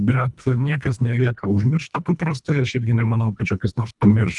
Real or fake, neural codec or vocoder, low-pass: fake; codec, 24 kHz, 1.5 kbps, HILCodec; 10.8 kHz